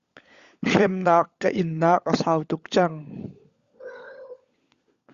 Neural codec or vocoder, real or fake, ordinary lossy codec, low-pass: codec, 16 kHz, 16 kbps, FunCodec, trained on LibriTTS, 50 frames a second; fake; Opus, 64 kbps; 7.2 kHz